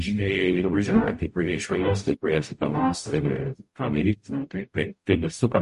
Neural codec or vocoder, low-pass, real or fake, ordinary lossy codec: codec, 44.1 kHz, 0.9 kbps, DAC; 14.4 kHz; fake; MP3, 48 kbps